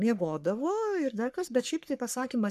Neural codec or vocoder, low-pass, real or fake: codec, 44.1 kHz, 3.4 kbps, Pupu-Codec; 14.4 kHz; fake